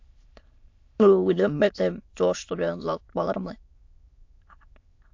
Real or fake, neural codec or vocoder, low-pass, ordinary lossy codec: fake; autoencoder, 22.05 kHz, a latent of 192 numbers a frame, VITS, trained on many speakers; 7.2 kHz; AAC, 48 kbps